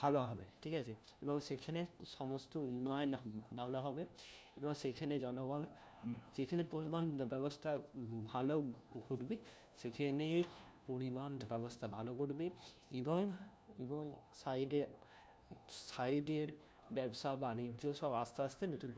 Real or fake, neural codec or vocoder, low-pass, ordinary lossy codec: fake; codec, 16 kHz, 1 kbps, FunCodec, trained on LibriTTS, 50 frames a second; none; none